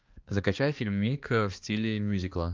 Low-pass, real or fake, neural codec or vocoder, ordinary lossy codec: 7.2 kHz; fake; codec, 16 kHz, 2 kbps, X-Codec, HuBERT features, trained on balanced general audio; Opus, 24 kbps